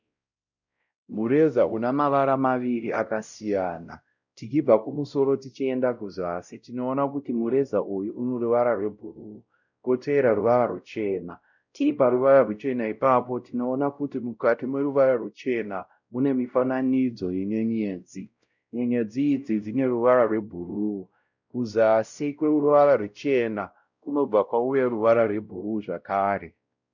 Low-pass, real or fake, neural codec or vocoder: 7.2 kHz; fake; codec, 16 kHz, 0.5 kbps, X-Codec, WavLM features, trained on Multilingual LibriSpeech